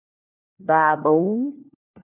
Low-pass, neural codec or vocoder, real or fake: 3.6 kHz; codec, 16 kHz, 1 kbps, FunCodec, trained on LibriTTS, 50 frames a second; fake